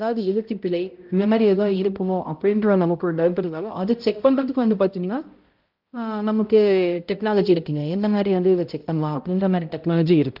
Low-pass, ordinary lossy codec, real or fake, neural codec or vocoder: 5.4 kHz; Opus, 32 kbps; fake; codec, 16 kHz, 0.5 kbps, X-Codec, HuBERT features, trained on balanced general audio